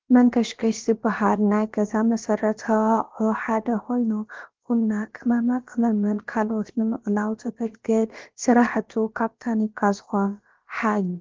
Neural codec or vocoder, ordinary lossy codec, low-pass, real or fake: codec, 16 kHz, about 1 kbps, DyCAST, with the encoder's durations; Opus, 16 kbps; 7.2 kHz; fake